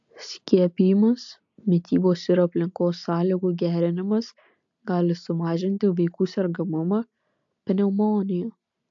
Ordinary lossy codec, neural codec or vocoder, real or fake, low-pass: AAC, 64 kbps; none; real; 7.2 kHz